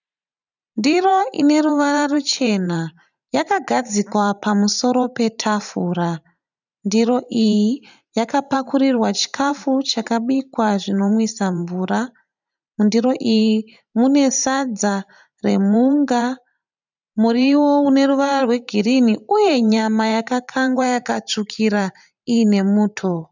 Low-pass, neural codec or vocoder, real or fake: 7.2 kHz; vocoder, 44.1 kHz, 128 mel bands every 512 samples, BigVGAN v2; fake